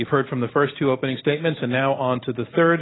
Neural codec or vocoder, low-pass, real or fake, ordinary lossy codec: none; 7.2 kHz; real; AAC, 16 kbps